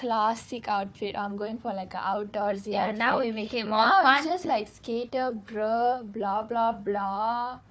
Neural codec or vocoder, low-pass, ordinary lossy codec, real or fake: codec, 16 kHz, 4 kbps, FunCodec, trained on Chinese and English, 50 frames a second; none; none; fake